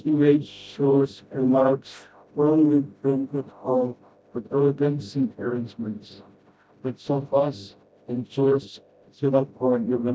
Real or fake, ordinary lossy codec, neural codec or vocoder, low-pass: fake; none; codec, 16 kHz, 0.5 kbps, FreqCodec, smaller model; none